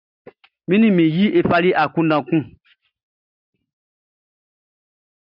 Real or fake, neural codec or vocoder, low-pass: real; none; 5.4 kHz